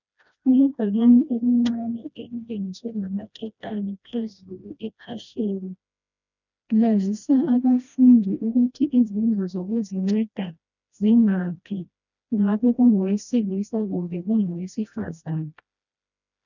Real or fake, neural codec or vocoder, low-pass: fake; codec, 16 kHz, 1 kbps, FreqCodec, smaller model; 7.2 kHz